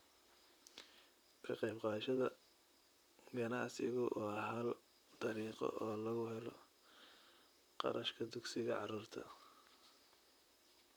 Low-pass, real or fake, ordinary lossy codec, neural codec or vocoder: none; fake; none; vocoder, 44.1 kHz, 128 mel bands, Pupu-Vocoder